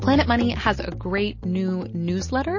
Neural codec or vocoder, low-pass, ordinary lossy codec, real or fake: none; 7.2 kHz; MP3, 32 kbps; real